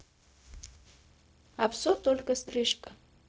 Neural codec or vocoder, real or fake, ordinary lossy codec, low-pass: codec, 16 kHz, 0.4 kbps, LongCat-Audio-Codec; fake; none; none